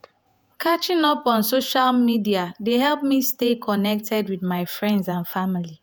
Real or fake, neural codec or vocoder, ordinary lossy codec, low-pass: fake; vocoder, 48 kHz, 128 mel bands, Vocos; none; none